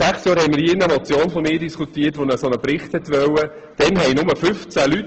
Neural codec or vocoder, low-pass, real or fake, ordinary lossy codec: none; 7.2 kHz; real; Opus, 16 kbps